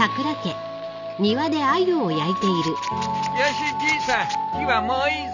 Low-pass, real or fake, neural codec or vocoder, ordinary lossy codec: 7.2 kHz; real; none; none